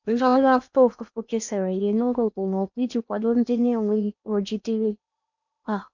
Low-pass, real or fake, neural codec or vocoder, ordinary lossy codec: 7.2 kHz; fake; codec, 16 kHz in and 24 kHz out, 0.8 kbps, FocalCodec, streaming, 65536 codes; none